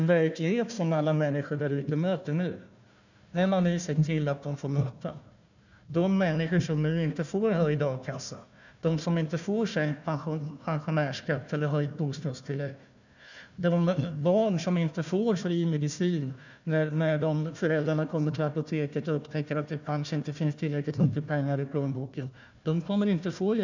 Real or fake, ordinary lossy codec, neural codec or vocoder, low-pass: fake; none; codec, 16 kHz, 1 kbps, FunCodec, trained on Chinese and English, 50 frames a second; 7.2 kHz